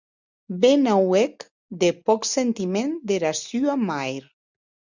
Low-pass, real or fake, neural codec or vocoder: 7.2 kHz; real; none